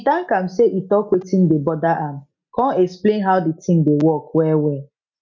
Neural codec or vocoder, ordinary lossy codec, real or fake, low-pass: none; none; real; 7.2 kHz